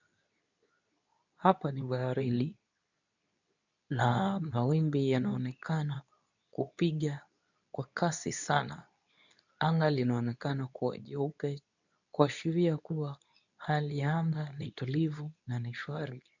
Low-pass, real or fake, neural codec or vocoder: 7.2 kHz; fake; codec, 24 kHz, 0.9 kbps, WavTokenizer, medium speech release version 2